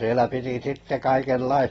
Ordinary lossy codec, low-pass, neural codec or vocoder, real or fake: AAC, 24 kbps; 9.9 kHz; none; real